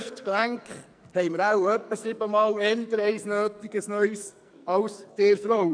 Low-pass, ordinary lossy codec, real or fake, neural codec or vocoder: 9.9 kHz; none; fake; codec, 44.1 kHz, 2.6 kbps, SNAC